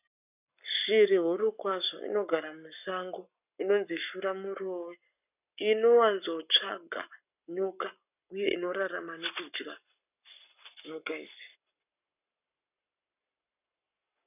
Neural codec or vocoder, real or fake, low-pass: codec, 44.1 kHz, 7.8 kbps, Pupu-Codec; fake; 3.6 kHz